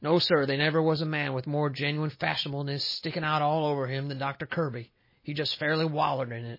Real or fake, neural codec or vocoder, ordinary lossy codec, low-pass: real; none; MP3, 24 kbps; 5.4 kHz